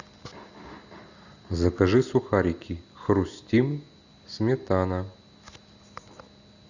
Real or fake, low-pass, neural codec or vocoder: real; 7.2 kHz; none